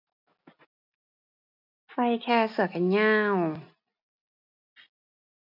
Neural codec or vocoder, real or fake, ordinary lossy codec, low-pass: none; real; none; 5.4 kHz